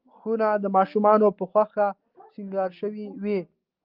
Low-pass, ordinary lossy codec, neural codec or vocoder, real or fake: 5.4 kHz; Opus, 32 kbps; none; real